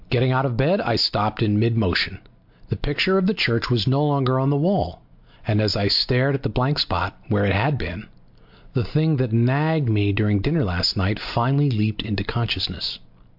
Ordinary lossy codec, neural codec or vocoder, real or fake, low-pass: MP3, 48 kbps; none; real; 5.4 kHz